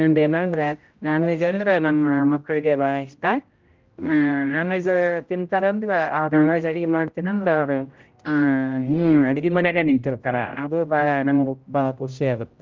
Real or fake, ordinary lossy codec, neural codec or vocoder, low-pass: fake; Opus, 24 kbps; codec, 16 kHz, 0.5 kbps, X-Codec, HuBERT features, trained on general audio; 7.2 kHz